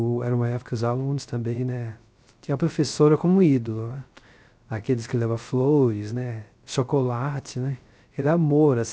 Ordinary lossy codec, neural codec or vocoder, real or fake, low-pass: none; codec, 16 kHz, 0.3 kbps, FocalCodec; fake; none